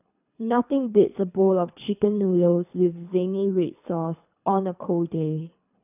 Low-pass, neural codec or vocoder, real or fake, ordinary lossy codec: 3.6 kHz; codec, 24 kHz, 3 kbps, HILCodec; fake; none